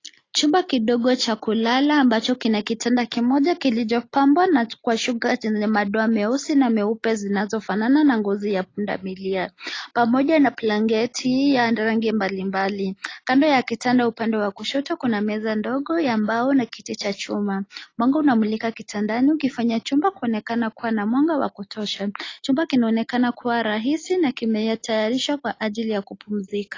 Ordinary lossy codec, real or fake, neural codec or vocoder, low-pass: AAC, 32 kbps; real; none; 7.2 kHz